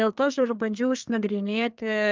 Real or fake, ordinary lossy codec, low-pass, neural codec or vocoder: fake; Opus, 24 kbps; 7.2 kHz; codec, 32 kHz, 1.9 kbps, SNAC